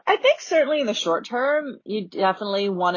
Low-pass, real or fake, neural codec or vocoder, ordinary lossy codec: 7.2 kHz; real; none; MP3, 32 kbps